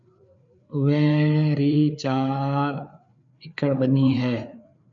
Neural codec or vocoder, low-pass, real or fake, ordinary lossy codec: codec, 16 kHz, 4 kbps, FreqCodec, larger model; 7.2 kHz; fake; MP3, 64 kbps